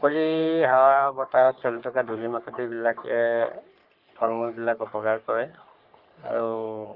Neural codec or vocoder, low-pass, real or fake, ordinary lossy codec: codec, 44.1 kHz, 3.4 kbps, Pupu-Codec; 5.4 kHz; fake; Opus, 32 kbps